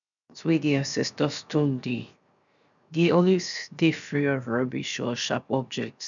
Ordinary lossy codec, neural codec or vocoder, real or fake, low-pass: none; codec, 16 kHz, 0.7 kbps, FocalCodec; fake; 7.2 kHz